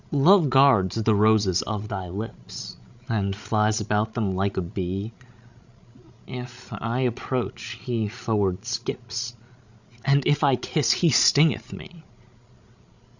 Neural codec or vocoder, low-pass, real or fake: codec, 16 kHz, 16 kbps, FreqCodec, larger model; 7.2 kHz; fake